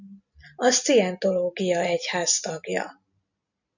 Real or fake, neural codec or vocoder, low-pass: real; none; 7.2 kHz